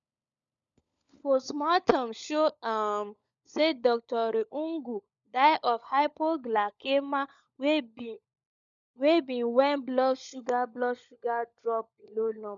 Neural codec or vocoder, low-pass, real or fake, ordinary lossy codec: codec, 16 kHz, 16 kbps, FunCodec, trained on LibriTTS, 50 frames a second; 7.2 kHz; fake; none